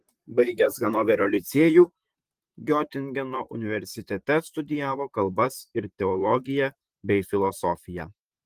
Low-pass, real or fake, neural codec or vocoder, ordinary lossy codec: 14.4 kHz; fake; vocoder, 44.1 kHz, 128 mel bands, Pupu-Vocoder; Opus, 24 kbps